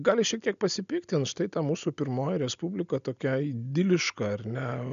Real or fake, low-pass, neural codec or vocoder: real; 7.2 kHz; none